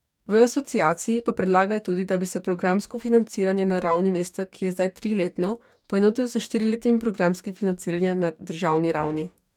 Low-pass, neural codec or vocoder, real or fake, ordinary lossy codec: 19.8 kHz; codec, 44.1 kHz, 2.6 kbps, DAC; fake; none